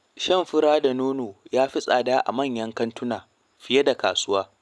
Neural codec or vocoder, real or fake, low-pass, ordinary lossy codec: none; real; none; none